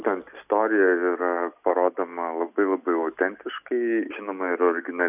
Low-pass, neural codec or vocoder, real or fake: 3.6 kHz; none; real